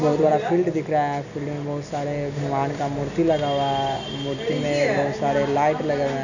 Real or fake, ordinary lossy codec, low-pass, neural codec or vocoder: real; none; 7.2 kHz; none